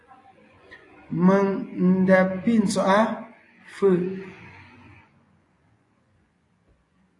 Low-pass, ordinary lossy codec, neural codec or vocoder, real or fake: 10.8 kHz; AAC, 48 kbps; vocoder, 24 kHz, 100 mel bands, Vocos; fake